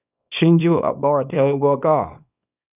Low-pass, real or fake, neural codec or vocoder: 3.6 kHz; fake; codec, 24 kHz, 0.9 kbps, WavTokenizer, small release